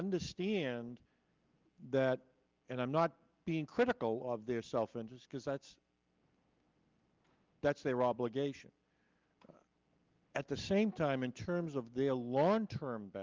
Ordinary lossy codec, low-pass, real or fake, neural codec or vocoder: Opus, 32 kbps; 7.2 kHz; real; none